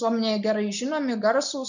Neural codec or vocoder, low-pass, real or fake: none; 7.2 kHz; real